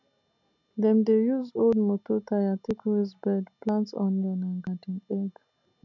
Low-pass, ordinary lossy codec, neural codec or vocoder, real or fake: 7.2 kHz; none; none; real